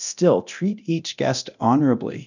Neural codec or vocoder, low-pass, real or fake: codec, 24 kHz, 0.9 kbps, DualCodec; 7.2 kHz; fake